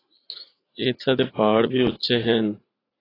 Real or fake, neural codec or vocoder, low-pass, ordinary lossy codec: fake; vocoder, 44.1 kHz, 80 mel bands, Vocos; 5.4 kHz; AAC, 24 kbps